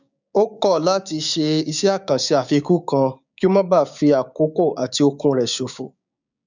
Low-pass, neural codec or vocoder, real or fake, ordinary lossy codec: 7.2 kHz; codec, 16 kHz, 6 kbps, DAC; fake; none